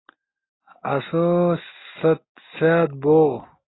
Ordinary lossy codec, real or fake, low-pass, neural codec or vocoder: AAC, 16 kbps; real; 7.2 kHz; none